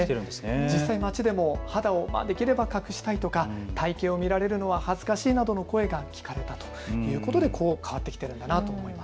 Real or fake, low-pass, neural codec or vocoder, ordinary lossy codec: real; none; none; none